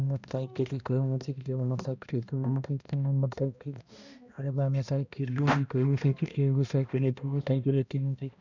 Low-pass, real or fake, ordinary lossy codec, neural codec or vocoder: 7.2 kHz; fake; none; codec, 16 kHz, 1 kbps, X-Codec, HuBERT features, trained on balanced general audio